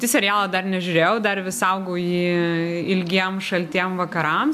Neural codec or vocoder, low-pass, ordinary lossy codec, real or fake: none; 14.4 kHz; AAC, 96 kbps; real